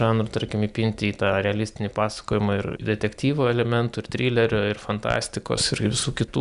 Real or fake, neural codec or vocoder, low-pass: real; none; 10.8 kHz